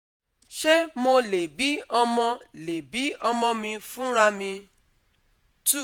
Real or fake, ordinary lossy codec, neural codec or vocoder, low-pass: fake; none; vocoder, 48 kHz, 128 mel bands, Vocos; none